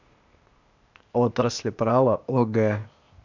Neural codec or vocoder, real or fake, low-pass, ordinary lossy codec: codec, 16 kHz, 0.8 kbps, ZipCodec; fake; 7.2 kHz; MP3, 64 kbps